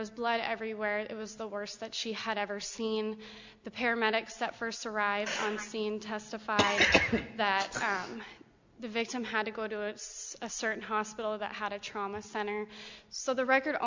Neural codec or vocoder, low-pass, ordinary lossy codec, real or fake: none; 7.2 kHz; MP3, 64 kbps; real